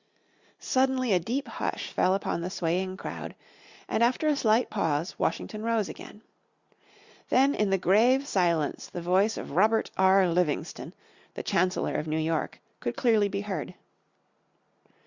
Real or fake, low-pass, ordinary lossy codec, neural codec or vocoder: real; 7.2 kHz; Opus, 64 kbps; none